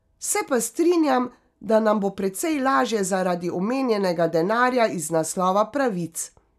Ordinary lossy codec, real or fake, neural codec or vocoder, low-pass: none; real; none; 14.4 kHz